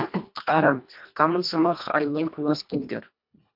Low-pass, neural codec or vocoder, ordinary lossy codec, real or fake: 5.4 kHz; codec, 24 kHz, 1.5 kbps, HILCodec; MP3, 48 kbps; fake